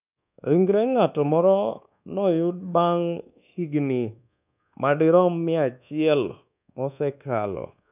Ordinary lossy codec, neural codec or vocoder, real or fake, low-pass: none; codec, 24 kHz, 1.2 kbps, DualCodec; fake; 3.6 kHz